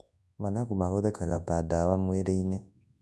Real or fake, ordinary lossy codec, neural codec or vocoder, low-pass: fake; none; codec, 24 kHz, 0.9 kbps, WavTokenizer, large speech release; none